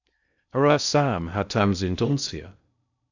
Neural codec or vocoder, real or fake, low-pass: codec, 16 kHz in and 24 kHz out, 0.6 kbps, FocalCodec, streaming, 4096 codes; fake; 7.2 kHz